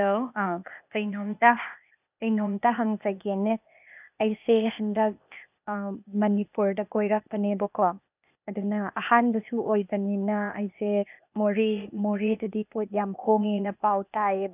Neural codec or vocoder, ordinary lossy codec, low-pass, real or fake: codec, 16 kHz, 0.8 kbps, ZipCodec; none; 3.6 kHz; fake